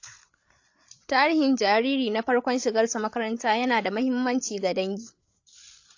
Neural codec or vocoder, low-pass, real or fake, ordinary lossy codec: codec, 16 kHz, 8 kbps, FreqCodec, larger model; 7.2 kHz; fake; AAC, 48 kbps